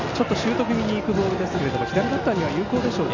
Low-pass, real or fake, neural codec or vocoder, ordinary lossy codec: 7.2 kHz; real; none; none